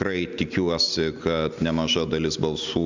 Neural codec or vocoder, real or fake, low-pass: none; real; 7.2 kHz